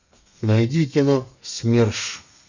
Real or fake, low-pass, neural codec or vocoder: fake; 7.2 kHz; codec, 32 kHz, 1.9 kbps, SNAC